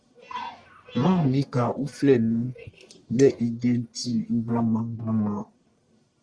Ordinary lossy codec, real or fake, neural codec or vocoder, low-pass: Opus, 64 kbps; fake; codec, 44.1 kHz, 1.7 kbps, Pupu-Codec; 9.9 kHz